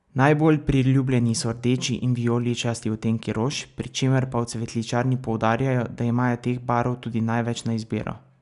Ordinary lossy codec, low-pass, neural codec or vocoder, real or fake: none; 10.8 kHz; none; real